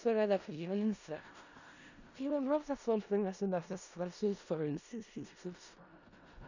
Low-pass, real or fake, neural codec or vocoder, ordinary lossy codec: 7.2 kHz; fake; codec, 16 kHz in and 24 kHz out, 0.4 kbps, LongCat-Audio-Codec, four codebook decoder; none